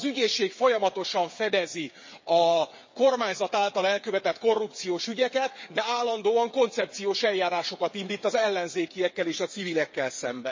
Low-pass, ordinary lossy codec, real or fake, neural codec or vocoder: 7.2 kHz; MP3, 32 kbps; fake; codec, 16 kHz, 8 kbps, FreqCodec, smaller model